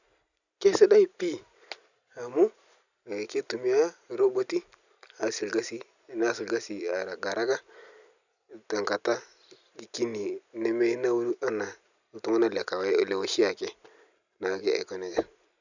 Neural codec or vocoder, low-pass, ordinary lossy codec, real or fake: none; 7.2 kHz; none; real